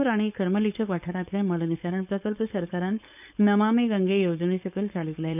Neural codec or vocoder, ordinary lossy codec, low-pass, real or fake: codec, 16 kHz, 4.8 kbps, FACodec; none; 3.6 kHz; fake